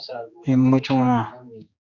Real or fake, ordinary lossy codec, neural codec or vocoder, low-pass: fake; Opus, 64 kbps; codec, 16 kHz, 2 kbps, X-Codec, HuBERT features, trained on general audio; 7.2 kHz